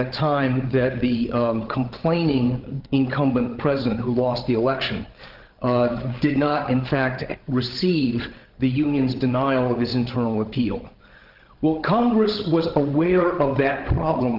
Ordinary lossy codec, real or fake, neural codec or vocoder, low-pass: Opus, 16 kbps; fake; codec, 16 kHz, 16 kbps, FreqCodec, larger model; 5.4 kHz